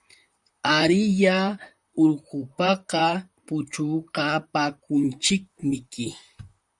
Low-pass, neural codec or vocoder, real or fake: 10.8 kHz; vocoder, 44.1 kHz, 128 mel bands, Pupu-Vocoder; fake